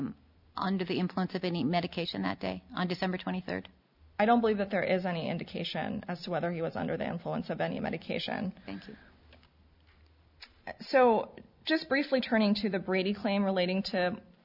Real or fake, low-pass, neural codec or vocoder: real; 5.4 kHz; none